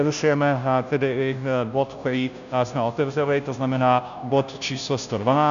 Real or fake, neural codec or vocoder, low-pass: fake; codec, 16 kHz, 0.5 kbps, FunCodec, trained on Chinese and English, 25 frames a second; 7.2 kHz